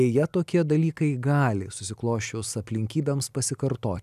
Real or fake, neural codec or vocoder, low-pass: real; none; 14.4 kHz